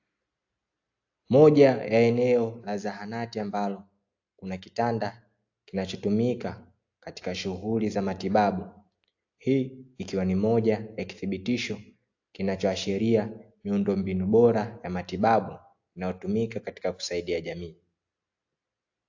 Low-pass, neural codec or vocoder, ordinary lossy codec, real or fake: 7.2 kHz; none; AAC, 48 kbps; real